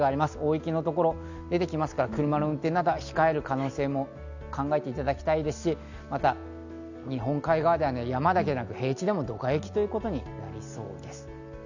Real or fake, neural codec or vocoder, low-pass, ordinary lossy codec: real; none; 7.2 kHz; none